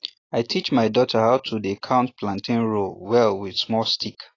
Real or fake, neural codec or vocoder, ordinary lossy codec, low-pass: real; none; AAC, 32 kbps; 7.2 kHz